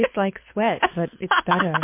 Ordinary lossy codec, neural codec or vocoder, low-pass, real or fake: MP3, 24 kbps; codec, 24 kHz, 6 kbps, HILCodec; 3.6 kHz; fake